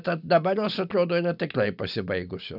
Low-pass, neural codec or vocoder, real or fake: 5.4 kHz; none; real